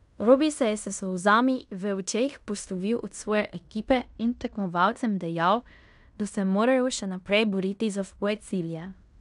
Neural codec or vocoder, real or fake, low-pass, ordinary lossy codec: codec, 16 kHz in and 24 kHz out, 0.9 kbps, LongCat-Audio-Codec, fine tuned four codebook decoder; fake; 10.8 kHz; none